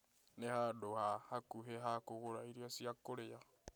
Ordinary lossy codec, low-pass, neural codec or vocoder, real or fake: none; none; none; real